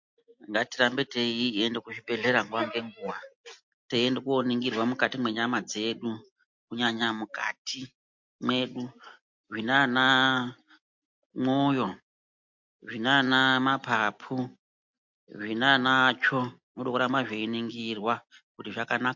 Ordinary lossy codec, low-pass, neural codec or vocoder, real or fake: MP3, 48 kbps; 7.2 kHz; none; real